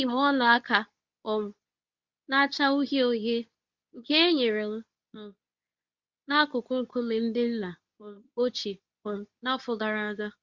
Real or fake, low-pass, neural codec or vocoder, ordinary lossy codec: fake; 7.2 kHz; codec, 24 kHz, 0.9 kbps, WavTokenizer, medium speech release version 2; Opus, 64 kbps